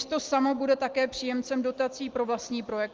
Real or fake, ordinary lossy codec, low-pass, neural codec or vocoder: real; Opus, 32 kbps; 7.2 kHz; none